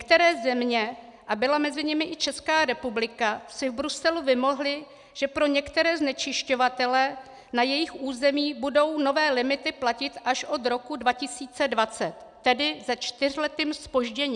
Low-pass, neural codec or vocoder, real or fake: 10.8 kHz; none; real